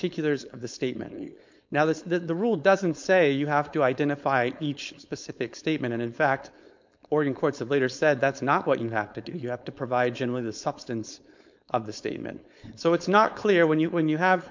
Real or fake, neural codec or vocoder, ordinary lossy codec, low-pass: fake; codec, 16 kHz, 4.8 kbps, FACodec; MP3, 64 kbps; 7.2 kHz